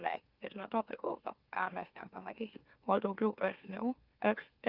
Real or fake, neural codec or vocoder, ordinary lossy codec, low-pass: fake; autoencoder, 44.1 kHz, a latent of 192 numbers a frame, MeloTTS; Opus, 32 kbps; 5.4 kHz